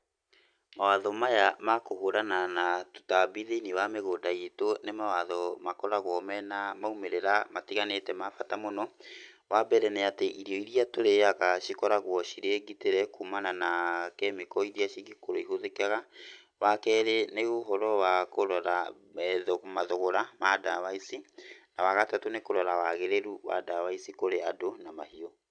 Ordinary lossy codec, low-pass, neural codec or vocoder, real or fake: none; 9.9 kHz; none; real